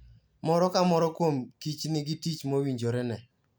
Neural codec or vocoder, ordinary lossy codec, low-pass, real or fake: none; none; none; real